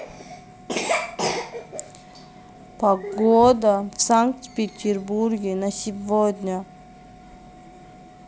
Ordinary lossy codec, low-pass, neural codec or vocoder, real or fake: none; none; none; real